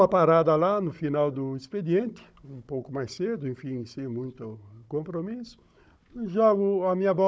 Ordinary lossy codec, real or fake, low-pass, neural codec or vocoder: none; fake; none; codec, 16 kHz, 16 kbps, FunCodec, trained on Chinese and English, 50 frames a second